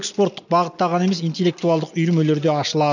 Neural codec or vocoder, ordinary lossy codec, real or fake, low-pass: none; none; real; 7.2 kHz